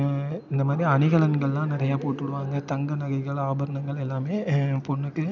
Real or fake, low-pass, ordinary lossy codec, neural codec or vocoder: real; 7.2 kHz; none; none